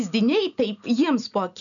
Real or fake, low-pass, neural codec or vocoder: real; 7.2 kHz; none